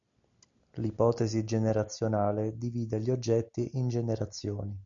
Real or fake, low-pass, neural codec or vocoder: real; 7.2 kHz; none